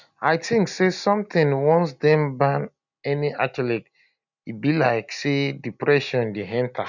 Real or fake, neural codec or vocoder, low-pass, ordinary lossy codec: real; none; 7.2 kHz; none